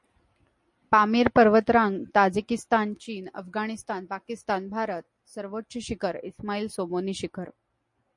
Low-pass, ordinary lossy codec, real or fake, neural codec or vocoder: 10.8 kHz; MP3, 48 kbps; real; none